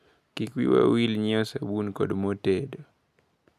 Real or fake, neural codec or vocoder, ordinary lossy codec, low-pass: real; none; none; 14.4 kHz